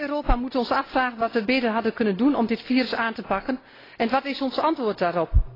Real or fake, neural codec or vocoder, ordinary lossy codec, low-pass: real; none; AAC, 24 kbps; 5.4 kHz